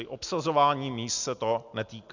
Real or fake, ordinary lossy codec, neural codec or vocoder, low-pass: real; Opus, 64 kbps; none; 7.2 kHz